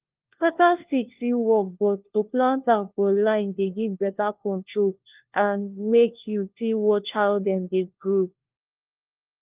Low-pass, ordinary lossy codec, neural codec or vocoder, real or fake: 3.6 kHz; Opus, 32 kbps; codec, 16 kHz, 1 kbps, FunCodec, trained on LibriTTS, 50 frames a second; fake